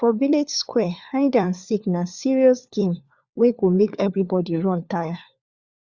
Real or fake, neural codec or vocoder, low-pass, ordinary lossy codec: fake; codec, 16 kHz, 4 kbps, FunCodec, trained on LibriTTS, 50 frames a second; 7.2 kHz; Opus, 64 kbps